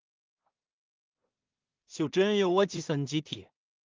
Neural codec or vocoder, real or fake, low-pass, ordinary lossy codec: codec, 16 kHz in and 24 kHz out, 0.4 kbps, LongCat-Audio-Codec, two codebook decoder; fake; 7.2 kHz; Opus, 32 kbps